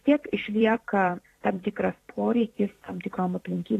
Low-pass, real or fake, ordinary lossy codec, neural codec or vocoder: 14.4 kHz; fake; AAC, 48 kbps; vocoder, 44.1 kHz, 128 mel bands every 256 samples, BigVGAN v2